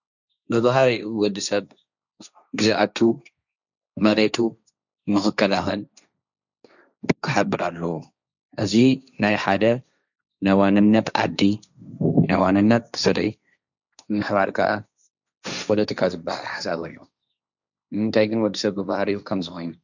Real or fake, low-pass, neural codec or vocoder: fake; 7.2 kHz; codec, 16 kHz, 1.1 kbps, Voila-Tokenizer